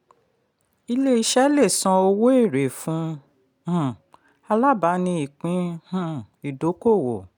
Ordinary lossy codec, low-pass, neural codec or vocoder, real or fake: none; 19.8 kHz; none; real